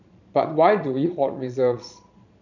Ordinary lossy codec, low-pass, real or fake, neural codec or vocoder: none; 7.2 kHz; fake; vocoder, 22.05 kHz, 80 mel bands, Vocos